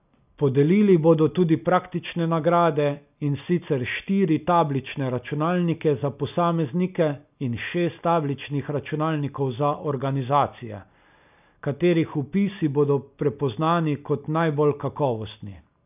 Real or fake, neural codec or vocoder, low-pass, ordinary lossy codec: real; none; 3.6 kHz; none